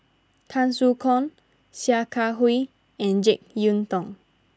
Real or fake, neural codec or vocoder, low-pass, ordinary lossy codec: real; none; none; none